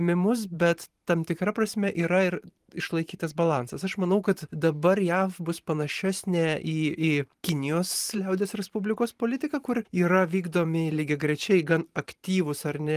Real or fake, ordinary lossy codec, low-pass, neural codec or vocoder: fake; Opus, 24 kbps; 14.4 kHz; vocoder, 44.1 kHz, 128 mel bands every 512 samples, BigVGAN v2